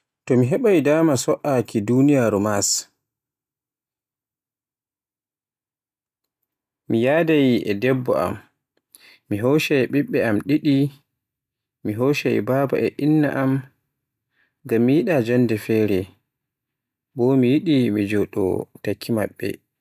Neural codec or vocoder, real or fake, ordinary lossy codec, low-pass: none; real; none; 14.4 kHz